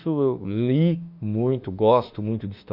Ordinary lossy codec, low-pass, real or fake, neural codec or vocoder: none; 5.4 kHz; fake; autoencoder, 48 kHz, 32 numbers a frame, DAC-VAE, trained on Japanese speech